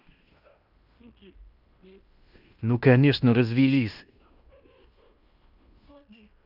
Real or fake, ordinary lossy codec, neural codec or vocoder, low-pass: fake; none; codec, 16 kHz in and 24 kHz out, 0.9 kbps, LongCat-Audio-Codec, fine tuned four codebook decoder; 5.4 kHz